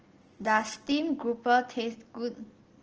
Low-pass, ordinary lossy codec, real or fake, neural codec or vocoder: 7.2 kHz; Opus, 16 kbps; real; none